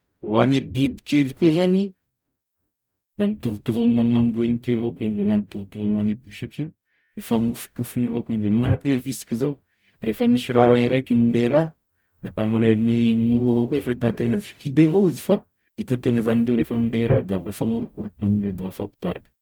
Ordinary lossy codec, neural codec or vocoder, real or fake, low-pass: none; codec, 44.1 kHz, 0.9 kbps, DAC; fake; none